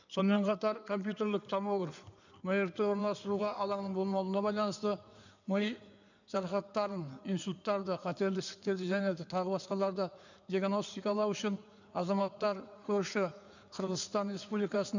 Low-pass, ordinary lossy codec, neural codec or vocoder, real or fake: 7.2 kHz; none; codec, 16 kHz in and 24 kHz out, 2.2 kbps, FireRedTTS-2 codec; fake